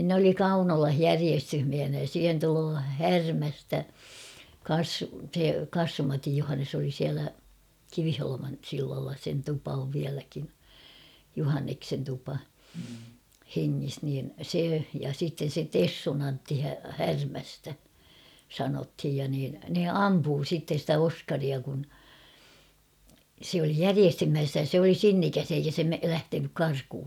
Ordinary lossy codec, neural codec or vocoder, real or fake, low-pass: none; none; real; 19.8 kHz